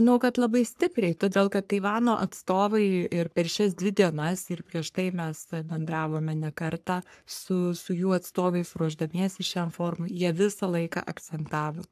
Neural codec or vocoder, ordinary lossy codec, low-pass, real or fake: codec, 44.1 kHz, 3.4 kbps, Pupu-Codec; AAC, 96 kbps; 14.4 kHz; fake